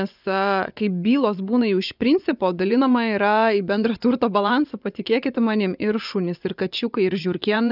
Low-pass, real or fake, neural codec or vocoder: 5.4 kHz; fake; vocoder, 24 kHz, 100 mel bands, Vocos